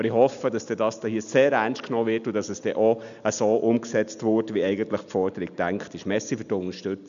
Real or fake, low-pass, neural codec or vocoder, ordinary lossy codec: real; 7.2 kHz; none; none